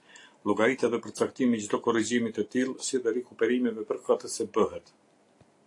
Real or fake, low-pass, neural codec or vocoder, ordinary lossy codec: real; 10.8 kHz; none; AAC, 48 kbps